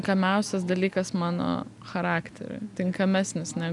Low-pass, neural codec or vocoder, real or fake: 10.8 kHz; none; real